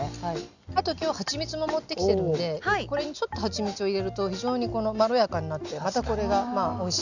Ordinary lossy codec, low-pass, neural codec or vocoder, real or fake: none; 7.2 kHz; none; real